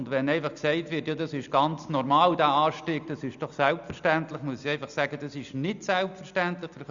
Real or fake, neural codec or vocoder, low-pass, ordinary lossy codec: real; none; 7.2 kHz; Opus, 64 kbps